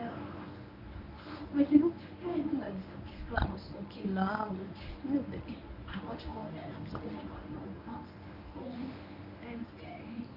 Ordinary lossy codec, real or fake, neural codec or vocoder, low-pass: none; fake; codec, 24 kHz, 0.9 kbps, WavTokenizer, medium speech release version 1; 5.4 kHz